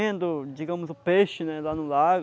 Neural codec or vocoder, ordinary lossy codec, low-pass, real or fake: none; none; none; real